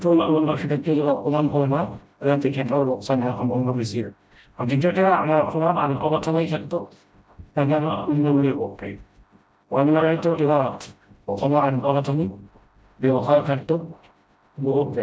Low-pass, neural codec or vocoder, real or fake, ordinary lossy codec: none; codec, 16 kHz, 0.5 kbps, FreqCodec, smaller model; fake; none